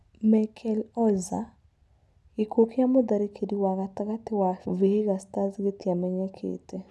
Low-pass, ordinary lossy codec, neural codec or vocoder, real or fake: none; none; none; real